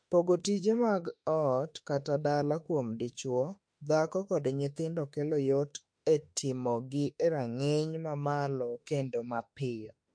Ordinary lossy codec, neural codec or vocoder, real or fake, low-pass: MP3, 48 kbps; autoencoder, 48 kHz, 32 numbers a frame, DAC-VAE, trained on Japanese speech; fake; 9.9 kHz